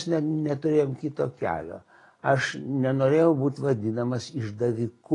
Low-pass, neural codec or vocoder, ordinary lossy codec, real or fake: 10.8 kHz; none; AAC, 32 kbps; real